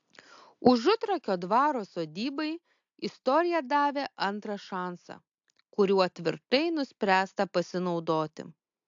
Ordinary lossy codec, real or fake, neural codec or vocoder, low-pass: AAC, 64 kbps; real; none; 7.2 kHz